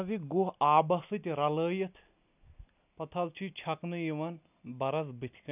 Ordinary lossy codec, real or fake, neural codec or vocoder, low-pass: none; real; none; 3.6 kHz